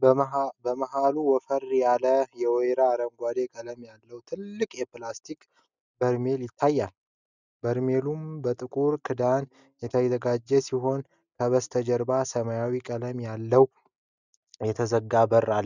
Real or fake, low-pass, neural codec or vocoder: real; 7.2 kHz; none